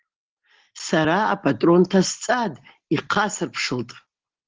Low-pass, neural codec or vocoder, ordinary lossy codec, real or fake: 7.2 kHz; none; Opus, 16 kbps; real